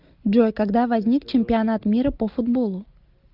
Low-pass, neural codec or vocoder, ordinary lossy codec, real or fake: 5.4 kHz; none; Opus, 32 kbps; real